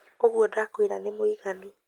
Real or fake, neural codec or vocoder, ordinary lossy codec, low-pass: real; none; Opus, 32 kbps; 19.8 kHz